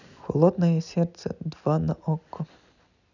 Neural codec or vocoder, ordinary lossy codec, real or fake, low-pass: none; none; real; 7.2 kHz